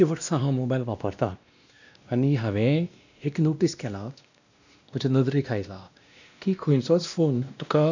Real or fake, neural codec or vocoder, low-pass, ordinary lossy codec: fake; codec, 16 kHz, 1 kbps, X-Codec, WavLM features, trained on Multilingual LibriSpeech; 7.2 kHz; none